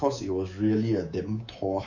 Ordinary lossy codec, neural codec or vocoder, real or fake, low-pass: none; codec, 24 kHz, 3.1 kbps, DualCodec; fake; 7.2 kHz